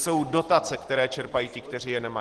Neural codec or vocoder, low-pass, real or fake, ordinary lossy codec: none; 10.8 kHz; real; Opus, 16 kbps